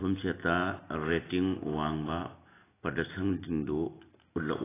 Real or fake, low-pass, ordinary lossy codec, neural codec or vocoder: real; 3.6 kHz; AAC, 16 kbps; none